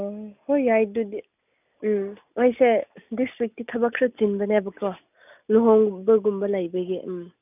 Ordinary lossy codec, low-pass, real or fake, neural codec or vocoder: none; 3.6 kHz; real; none